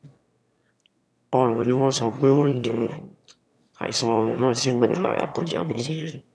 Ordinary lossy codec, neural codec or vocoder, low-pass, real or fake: none; autoencoder, 22.05 kHz, a latent of 192 numbers a frame, VITS, trained on one speaker; none; fake